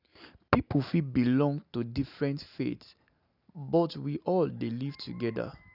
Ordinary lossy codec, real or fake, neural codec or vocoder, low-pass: none; real; none; 5.4 kHz